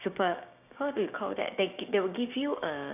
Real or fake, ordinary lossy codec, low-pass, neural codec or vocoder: fake; none; 3.6 kHz; codec, 16 kHz, 6 kbps, DAC